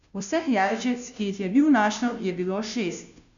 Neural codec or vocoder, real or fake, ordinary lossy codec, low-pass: codec, 16 kHz, 0.5 kbps, FunCodec, trained on Chinese and English, 25 frames a second; fake; none; 7.2 kHz